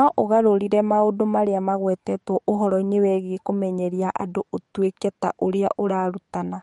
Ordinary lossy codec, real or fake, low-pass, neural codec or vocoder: MP3, 48 kbps; fake; 19.8 kHz; codec, 44.1 kHz, 7.8 kbps, DAC